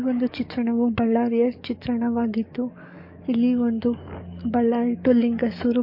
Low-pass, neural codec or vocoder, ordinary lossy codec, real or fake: 5.4 kHz; codec, 44.1 kHz, 7.8 kbps, DAC; MP3, 32 kbps; fake